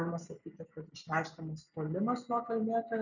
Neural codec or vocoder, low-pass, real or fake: none; 7.2 kHz; real